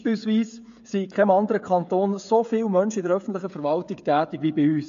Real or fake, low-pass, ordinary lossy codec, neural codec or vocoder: fake; 7.2 kHz; MP3, 48 kbps; codec, 16 kHz, 16 kbps, FreqCodec, smaller model